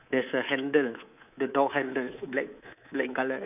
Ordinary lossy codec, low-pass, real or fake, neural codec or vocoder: none; 3.6 kHz; fake; codec, 24 kHz, 3.1 kbps, DualCodec